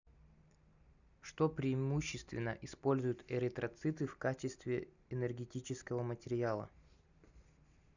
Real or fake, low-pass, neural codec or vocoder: real; 7.2 kHz; none